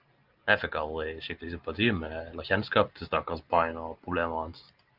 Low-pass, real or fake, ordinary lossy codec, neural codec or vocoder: 5.4 kHz; real; Opus, 24 kbps; none